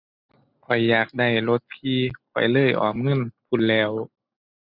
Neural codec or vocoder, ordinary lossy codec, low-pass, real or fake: none; none; 5.4 kHz; real